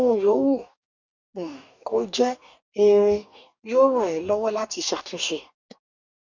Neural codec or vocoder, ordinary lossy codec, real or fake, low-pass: codec, 44.1 kHz, 2.6 kbps, DAC; none; fake; 7.2 kHz